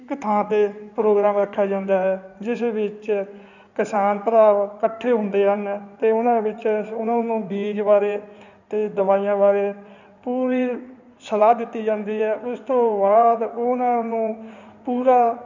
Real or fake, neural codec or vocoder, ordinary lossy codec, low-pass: fake; codec, 16 kHz in and 24 kHz out, 2.2 kbps, FireRedTTS-2 codec; none; 7.2 kHz